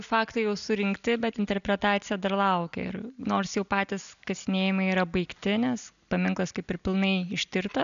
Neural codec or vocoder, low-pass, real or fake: none; 7.2 kHz; real